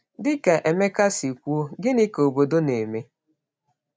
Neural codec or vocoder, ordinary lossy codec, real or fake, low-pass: none; none; real; none